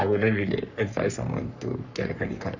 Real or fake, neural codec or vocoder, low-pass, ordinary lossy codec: fake; codec, 44.1 kHz, 3.4 kbps, Pupu-Codec; 7.2 kHz; none